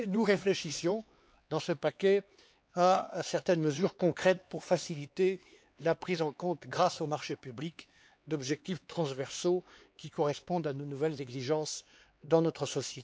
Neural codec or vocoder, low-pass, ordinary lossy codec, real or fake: codec, 16 kHz, 4 kbps, X-Codec, HuBERT features, trained on LibriSpeech; none; none; fake